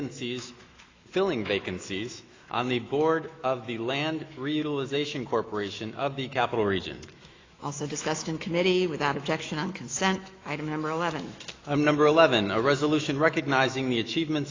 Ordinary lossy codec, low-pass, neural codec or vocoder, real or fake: AAC, 32 kbps; 7.2 kHz; autoencoder, 48 kHz, 128 numbers a frame, DAC-VAE, trained on Japanese speech; fake